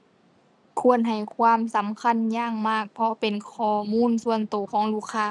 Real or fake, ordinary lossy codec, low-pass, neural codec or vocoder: real; none; none; none